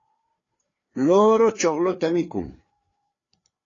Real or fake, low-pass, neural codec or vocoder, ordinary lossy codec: fake; 7.2 kHz; codec, 16 kHz, 4 kbps, FreqCodec, larger model; AAC, 32 kbps